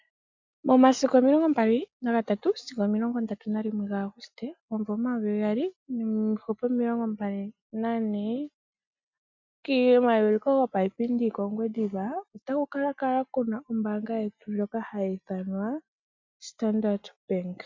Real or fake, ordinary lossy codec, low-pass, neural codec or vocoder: real; MP3, 64 kbps; 7.2 kHz; none